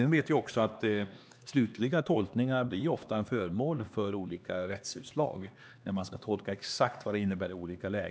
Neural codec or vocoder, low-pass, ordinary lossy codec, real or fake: codec, 16 kHz, 2 kbps, X-Codec, HuBERT features, trained on LibriSpeech; none; none; fake